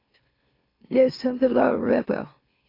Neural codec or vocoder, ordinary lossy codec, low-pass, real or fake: autoencoder, 44.1 kHz, a latent of 192 numbers a frame, MeloTTS; AAC, 32 kbps; 5.4 kHz; fake